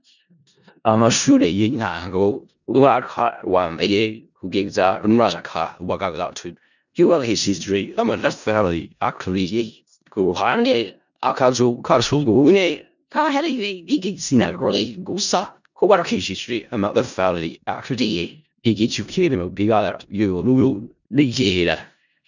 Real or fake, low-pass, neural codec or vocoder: fake; 7.2 kHz; codec, 16 kHz in and 24 kHz out, 0.4 kbps, LongCat-Audio-Codec, four codebook decoder